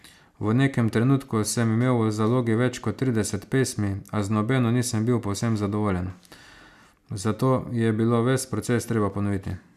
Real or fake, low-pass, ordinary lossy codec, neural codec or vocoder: real; 14.4 kHz; none; none